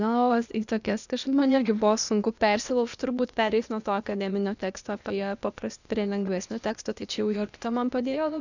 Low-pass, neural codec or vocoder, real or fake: 7.2 kHz; codec, 16 kHz, 0.8 kbps, ZipCodec; fake